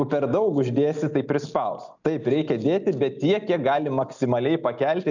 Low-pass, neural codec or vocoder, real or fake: 7.2 kHz; none; real